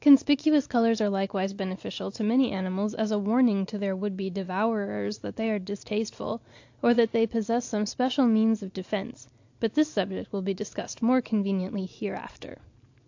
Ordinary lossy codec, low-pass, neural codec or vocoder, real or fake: AAC, 48 kbps; 7.2 kHz; none; real